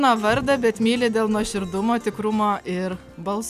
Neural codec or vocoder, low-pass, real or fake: none; 14.4 kHz; real